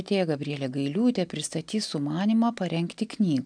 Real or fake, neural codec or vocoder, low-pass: real; none; 9.9 kHz